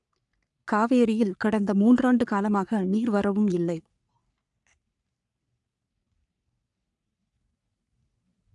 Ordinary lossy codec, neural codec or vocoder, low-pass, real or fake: none; codec, 44.1 kHz, 3.4 kbps, Pupu-Codec; 10.8 kHz; fake